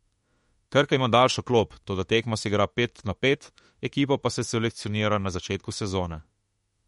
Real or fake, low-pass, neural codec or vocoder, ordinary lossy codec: fake; 19.8 kHz; autoencoder, 48 kHz, 32 numbers a frame, DAC-VAE, trained on Japanese speech; MP3, 48 kbps